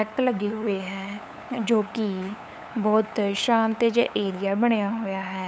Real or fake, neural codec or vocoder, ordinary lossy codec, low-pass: fake; codec, 16 kHz, 8 kbps, FunCodec, trained on LibriTTS, 25 frames a second; none; none